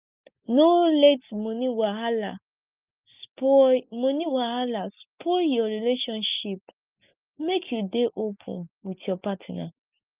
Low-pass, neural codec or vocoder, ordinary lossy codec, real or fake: 3.6 kHz; none; Opus, 24 kbps; real